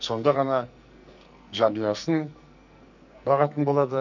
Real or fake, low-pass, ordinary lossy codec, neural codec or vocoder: fake; 7.2 kHz; none; codec, 44.1 kHz, 2.6 kbps, SNAC